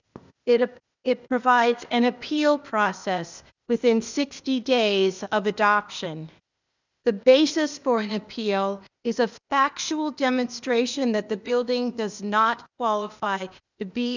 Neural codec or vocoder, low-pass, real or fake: codec, 16 kHz, 0.8 kbps, ZipCodec; 7.2 kHz; fake